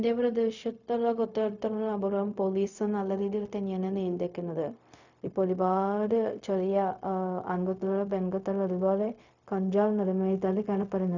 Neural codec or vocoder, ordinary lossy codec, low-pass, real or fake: codec, 16 kHz, 0.4 kbps, LongCat-Audio-Codec; none; 7.2 kHz; fake